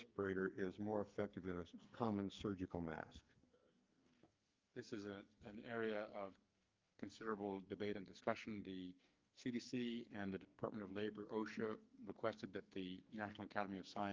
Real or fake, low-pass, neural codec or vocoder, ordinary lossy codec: fake; 7.2 kHz; codec, 44.1 kHz, 2.6 kbps, SNAC; Opus, 24 kbps